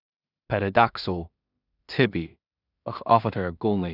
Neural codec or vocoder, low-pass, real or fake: codec, 16 kHz in and 24 kHz out, 0.4 kbps, LongCat-Audio-Codec, two codebook decoder; 5.4 kHz; fake